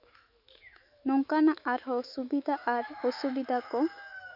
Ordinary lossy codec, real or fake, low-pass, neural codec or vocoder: none; fake; 5.4 kHz; autoencoder, 48 kHz, 128 numbers a frame, DAC-VAE, trained on Japanese speech